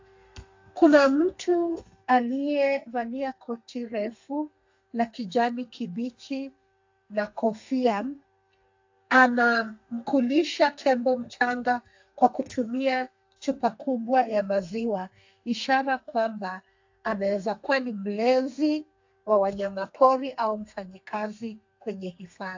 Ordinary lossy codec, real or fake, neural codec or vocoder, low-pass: AAC, 48 kbps; fake; codec, 32 kHz, 1.9 kbps, SNAC; 7.2 kHz